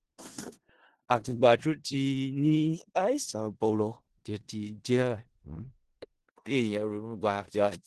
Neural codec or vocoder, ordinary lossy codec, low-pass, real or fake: codec, 16 kHz in and 24 kHz out, 0.4 kbps, LongCat-Audio-Codec, four codebook decoder; Opus, 16 kbps; 10.8 kHz; fake